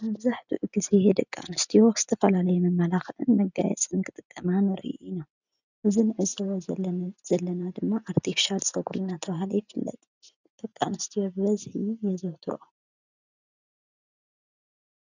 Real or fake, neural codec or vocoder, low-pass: real; none; 7.2 kHz